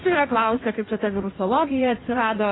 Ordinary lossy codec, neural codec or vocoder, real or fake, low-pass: AAC, 16 kbps; codec, 16 kHz in and 24 kHz out, 1.1 kbps, FireRedTTS-2 codec; fake; 7.2 kHz